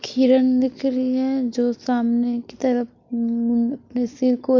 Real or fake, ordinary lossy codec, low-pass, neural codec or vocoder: real; MP3, 48 kbps; 7.2 kHz; none